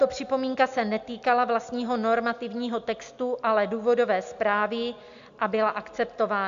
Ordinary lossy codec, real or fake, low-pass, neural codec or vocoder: AAC, 64 kbps; real; 7.2 kHz; none